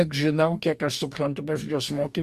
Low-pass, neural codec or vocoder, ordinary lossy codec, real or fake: 14.4 kHz; codec, 44.1 kHz, 2.6 kbps, DAC; Opus, 64 kbps; fake